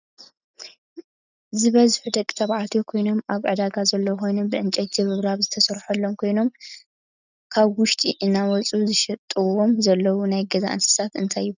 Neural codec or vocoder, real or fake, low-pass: none; real; 7.2 kHz